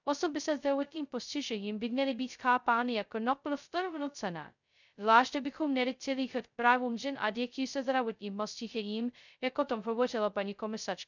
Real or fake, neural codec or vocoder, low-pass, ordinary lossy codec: fake; codec, 16 kHz, 0.2 kbps, FocalCodec; 7.2 kHz; none